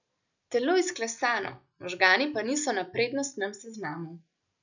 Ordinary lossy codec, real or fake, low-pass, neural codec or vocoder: none; real; 7.2 kHz; none